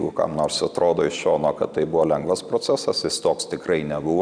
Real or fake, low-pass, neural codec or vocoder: real; 9.9 kHz; none